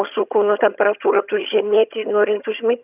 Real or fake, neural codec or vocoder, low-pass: fake; vocoder, 22.05 kHz, 80 mel bands, HiFi-GAN; 3.6 kHz